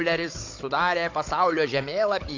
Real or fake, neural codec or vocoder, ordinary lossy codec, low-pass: fake; codec, 16 kHz, 16 kbps, FunCodec, trained on Chinese and English, 50 frames a second; AAC, 48 kbps; 7.2 kHz